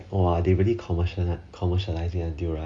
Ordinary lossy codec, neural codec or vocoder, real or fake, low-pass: none; none; real; 9.9 kHz